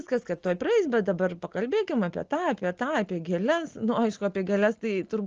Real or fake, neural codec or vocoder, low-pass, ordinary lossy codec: real; none; 7.2 kHz; Opus, 24 kbps